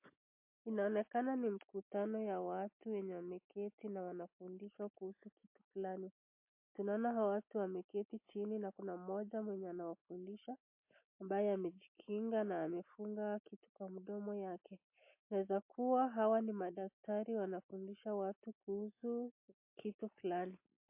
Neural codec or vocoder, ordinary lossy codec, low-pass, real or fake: none; MP3, 24 kbps; 3.6 kHz; real